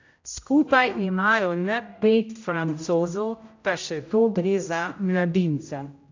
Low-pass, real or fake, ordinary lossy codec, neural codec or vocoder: 7.2 kHz; fake; AAC, 48 kbps; codec, 16 kHz, 0.5 kbps, X-Codec, HuBERT features, trained on general audio